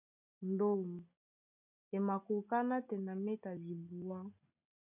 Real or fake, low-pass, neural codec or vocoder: real; 3.6 kHz; none